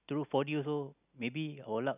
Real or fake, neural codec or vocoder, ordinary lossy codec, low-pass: real; none; none; 3.6 kHz